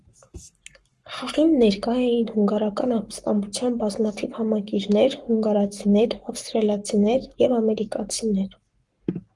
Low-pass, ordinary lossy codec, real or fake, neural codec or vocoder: 10.8 kHz; Opus, 24 kbps; fake; codec, 44.1 kHz, 7.8 kbps, Pupu-Codec